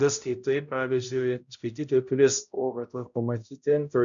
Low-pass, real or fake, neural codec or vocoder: 7.2 kHz; fake; codec, 16 kHz, 0.5 kbps, X-Codec, HuBERT features, trained on balanced general audio